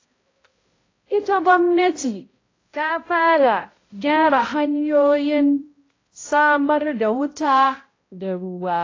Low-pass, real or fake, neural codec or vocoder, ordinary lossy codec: 7.2 kHz; fake; codec, 16 kHz, 0.5 kbps, X-Codec, HuBERT features, trained on balanced general audio; AAC, 32 kbps